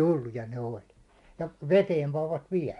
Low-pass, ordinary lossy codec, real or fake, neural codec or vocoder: 10.8 kHz; MP3, 64 kbps; real; none